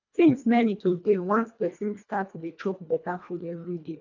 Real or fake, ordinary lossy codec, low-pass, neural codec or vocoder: fake; none; 7.2 kHz; codec, 24 kHz, 1.5 kbps, HILCodec